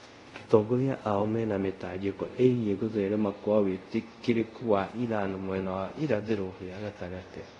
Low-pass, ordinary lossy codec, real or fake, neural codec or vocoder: 10.8 kHz; AAC, 32 kbps; fake; codec, 24 kHz, 0.5 kbps, DualCodec